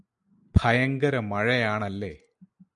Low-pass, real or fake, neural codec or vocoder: 10.8 kHz; real; none